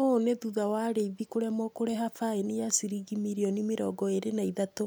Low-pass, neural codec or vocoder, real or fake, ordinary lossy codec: none; none; real; none